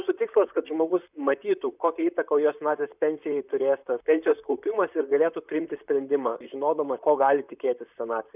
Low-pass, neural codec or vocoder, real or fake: 3.6 kHz; vocoder, 44.1 kHz, 128 mel bands, Pupu-Vocoder; fake